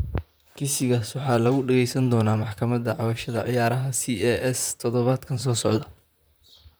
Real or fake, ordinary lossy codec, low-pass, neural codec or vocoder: fake; none; none; vocoder, 44.1 kHz, 128 mel bands, Pupu-Vocoder